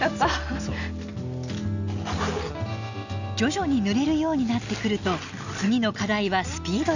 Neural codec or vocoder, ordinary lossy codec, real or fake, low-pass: none; none; real; 7.2 kHz